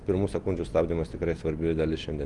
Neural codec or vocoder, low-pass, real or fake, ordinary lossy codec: none; 10.8 kHz; real; Opus, 32 kbps